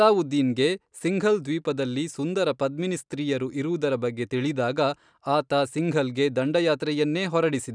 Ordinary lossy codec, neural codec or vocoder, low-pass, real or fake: none; none; 9.9 kHz; real